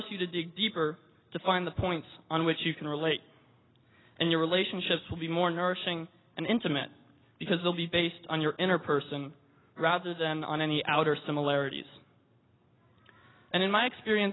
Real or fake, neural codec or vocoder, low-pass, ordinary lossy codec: real; none; 7.2 kHz; AAC, 16 kbps